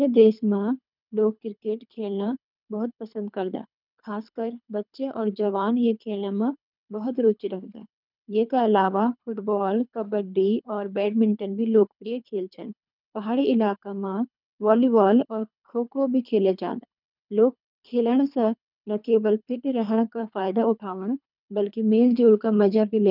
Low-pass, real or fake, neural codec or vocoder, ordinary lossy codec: 5.4 kHz; fake; codec, 24 kHz, 3 kbps, HILCodec; none